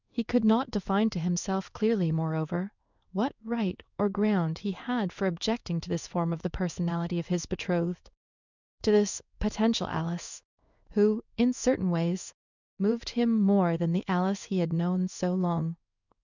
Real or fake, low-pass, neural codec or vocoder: fake; 7.2 kHz; codec, 16 kHz in and 24 kHz out, 1 kbps, XY-Tokenizer